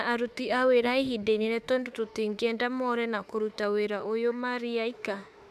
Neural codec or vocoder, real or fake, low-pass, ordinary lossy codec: autoencoder, 48 kHz, 32 numbers a frame, DAC-VAE, trained on Japanese speech; fake; 14.4 kHz; none